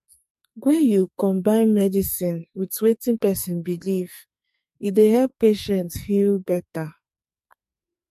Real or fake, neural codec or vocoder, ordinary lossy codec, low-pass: fake; codec, 44.1 kHz, 2.6 kbps, SNAC; MP3, 64 kbps; 14.4 kHz